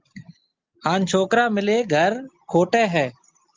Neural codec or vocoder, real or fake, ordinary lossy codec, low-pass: none; real; Opus, 32 kbps; 7.2 kHz